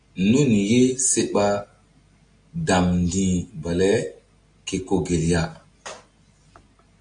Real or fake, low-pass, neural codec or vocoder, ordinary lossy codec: real; 9.9 kHz; none; AAC, 64 kbps